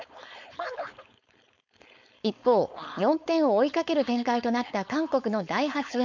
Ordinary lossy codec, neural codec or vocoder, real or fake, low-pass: MP3, 64 kbps; codec, 16 kHz, 4.8 kbps, FACodec; fake; 7.2 kHz